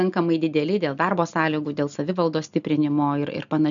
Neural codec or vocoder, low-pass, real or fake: none; 7.2 kHz; real